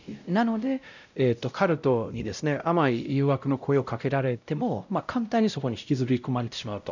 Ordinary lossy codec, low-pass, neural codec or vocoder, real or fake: none; 7.2 kHz; codec, 16 kHz, 0.5 kbps, X-Codec, WavLM features, trained on Multilingual LibriSpeech; fake